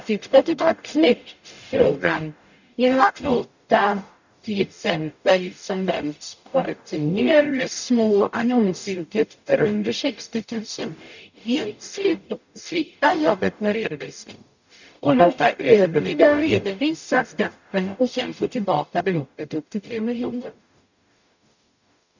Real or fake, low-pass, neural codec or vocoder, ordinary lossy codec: fake; 7.2 kHz; codec, 44.1 kHz, 0.9 kbps, DAC; none